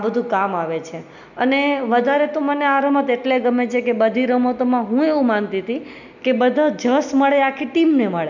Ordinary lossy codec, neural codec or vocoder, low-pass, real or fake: none; none; 7.2 kHz; real